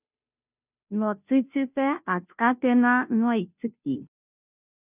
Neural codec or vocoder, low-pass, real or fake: codec, 16 kHz, 0.5 kbps, FunCodec, trained on Chinese and English, 25 frames a second; 3.6 kHz; fake